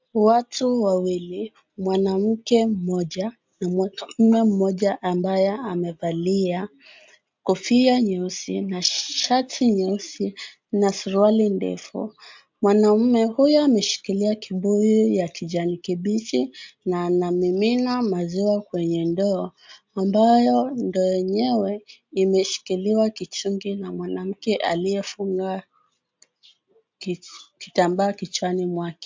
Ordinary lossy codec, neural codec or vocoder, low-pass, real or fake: MP3, 64 kbps; none; 7.2 kHz; real